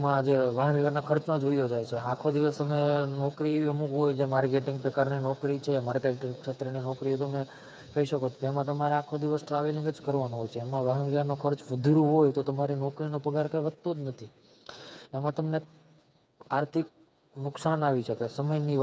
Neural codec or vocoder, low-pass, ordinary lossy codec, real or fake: codec, 16 kHz, 4 kbps, FreqCodec, smaller model; none; none; fake